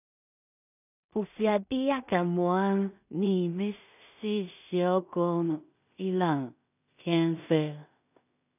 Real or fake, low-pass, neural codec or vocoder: fake; 3.6 kHz; codec, 16 kHz in and 24 kHz out, 0.4 kbps, LongCat-Audio-Codec, two codebook decoder